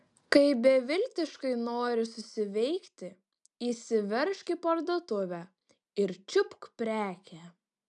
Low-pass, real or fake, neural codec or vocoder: 10.8 kHz; real; none